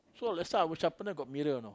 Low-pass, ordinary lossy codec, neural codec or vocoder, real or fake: none; none; none; real